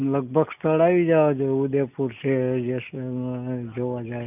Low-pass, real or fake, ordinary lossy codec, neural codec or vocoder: 3.6 kHz; real; none; none